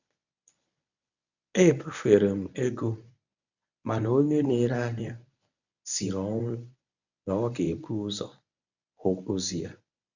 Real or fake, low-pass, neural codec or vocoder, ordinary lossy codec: fake; 7.2 kHz; codec, 24 kHz, 0.9 kbps, WavTokenizer, medium speech release version 1; none